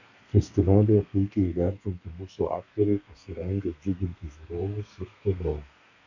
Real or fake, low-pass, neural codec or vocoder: fake; 7.2 kHz; codec, 32 kHz, 1.9 kbps, SNAC